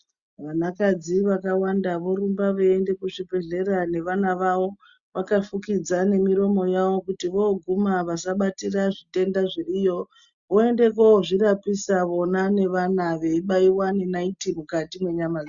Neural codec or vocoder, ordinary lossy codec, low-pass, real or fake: none; Opus, 64 kbps; 7.2 kHz; real